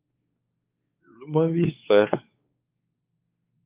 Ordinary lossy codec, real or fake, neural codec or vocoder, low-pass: Opus, 24 kbps; fake; codec, 16 kHz, 4 kbps, X-Codec, WavLM features, trained on Multilingual LibriSpeech; 3.6 kHz